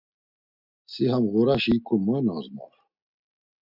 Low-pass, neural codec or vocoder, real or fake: 5.4 kHz; none; real